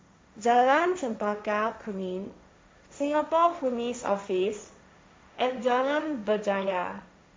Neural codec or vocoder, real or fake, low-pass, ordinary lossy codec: codec, 16 kHz, 1.1 kbps, Voila-Tokenizer; fake; none; none